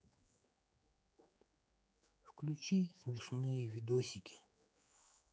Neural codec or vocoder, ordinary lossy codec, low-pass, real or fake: codec, 16 kHz, 4 kbps, X-Codec, HuBERT features, trained on general audio; none; none; fake